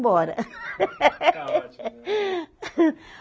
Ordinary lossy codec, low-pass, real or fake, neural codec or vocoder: none; none; real; none